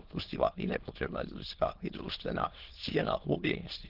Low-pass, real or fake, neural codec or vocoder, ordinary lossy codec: 5.4 kHz; fake; autoencoder, 22.05 kHz, a latent of 192 numbers a frame, VITS, trained on many speakers; Opus, 16 kbps